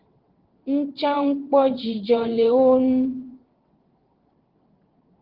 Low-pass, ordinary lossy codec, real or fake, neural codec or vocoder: 5.4 kHz; Opus, 16 kbps; fake; vocoder, 22.05 kHz, 80 mel bands, WaveNeXt